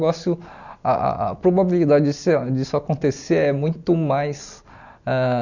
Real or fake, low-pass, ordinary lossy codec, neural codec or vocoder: real; 7.2 kHz; none; none